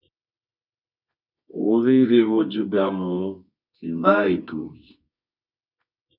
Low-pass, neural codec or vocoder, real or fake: 5.4 kHz; codec, 24 kHz, 0.9 kbps, WavTokenizer, medium music audio release; fake